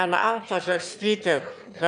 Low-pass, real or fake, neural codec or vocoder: 9.9 kHz; fake; autoencoder, 22.05 kHz, a latent of 192 numbers a frame, VITS, trained on one speaker